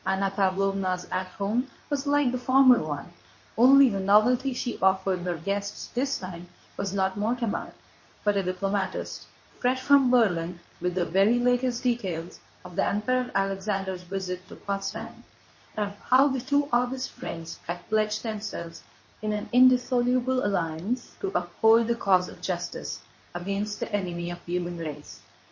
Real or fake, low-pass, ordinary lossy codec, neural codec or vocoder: fake; 7.2 kHz; MP3, 32 kbps; codec, 24 kHz, 0.9 kbps, WavTokenizer, medium speech release version 2